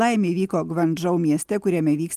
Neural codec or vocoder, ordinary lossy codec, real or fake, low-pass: none; Opus, 24 kbps; real; 14.4 kHz